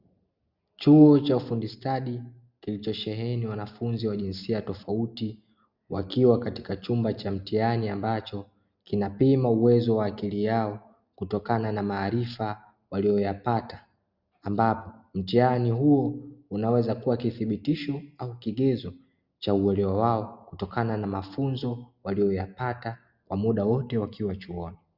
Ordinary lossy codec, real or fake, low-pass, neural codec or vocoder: Opus, 64 kbps; real; 5.4 kHz; none